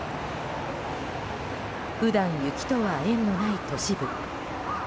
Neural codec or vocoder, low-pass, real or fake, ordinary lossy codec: none; none; real; none